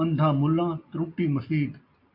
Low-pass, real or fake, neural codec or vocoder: 5.4 kHz; real; none